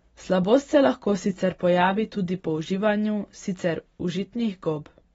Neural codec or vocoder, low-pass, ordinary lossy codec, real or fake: none; 9.9 kHz; AAC, 24 kbps; real